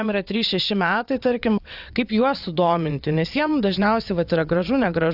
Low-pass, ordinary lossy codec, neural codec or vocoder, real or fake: 5.4 kHz; AAC, 48 kbps; vocoder, 22.05 kHz, 80 mel bands, WaveNeXt; fake